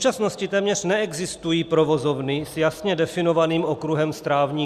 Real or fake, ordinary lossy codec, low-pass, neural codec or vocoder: real; Opus, 64 kbps; 14.4 kHz; none